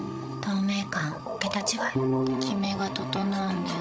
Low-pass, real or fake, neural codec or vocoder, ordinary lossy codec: none; fake; codec, 16 kHz, 16 kbps, FreqCodec, larger model; none